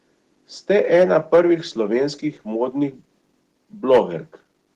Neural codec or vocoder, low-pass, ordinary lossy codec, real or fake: none; 14.4 kHz; Opus, 16 kbps; real